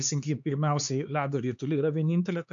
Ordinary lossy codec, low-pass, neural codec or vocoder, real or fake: AAC, 64 kbps; 7.2 kHz; codec, 16 kHz, 4 kbps, X-Codec, HuBERT features, trained on LibriSpeech; fake